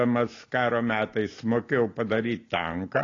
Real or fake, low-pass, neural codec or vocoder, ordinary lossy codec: real; 7.2 kHz; none; AAC, 32 kbps